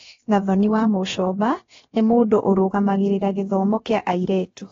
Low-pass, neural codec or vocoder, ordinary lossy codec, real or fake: 7.2 kHz; codec, 16 kHz, about 1 kbps, DyCAST, with the encoder's durations; AAC, 24 kbps; fake